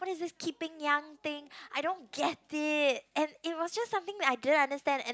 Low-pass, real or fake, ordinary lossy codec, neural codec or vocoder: none; real; none; none